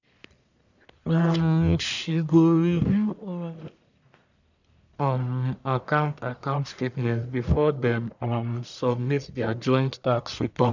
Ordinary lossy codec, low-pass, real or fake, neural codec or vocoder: none; 7.2 kHz; fake; codec, 44.1 kHz, 1.7 kbps, Pupu-Codec